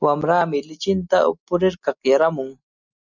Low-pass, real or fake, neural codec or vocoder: 7.2 kHz; real; none